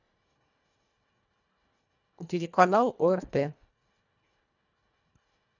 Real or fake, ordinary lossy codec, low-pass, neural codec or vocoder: fake; none; 7.2 kHz; codec, 24 kHz, 1.5 kbps, HILCodec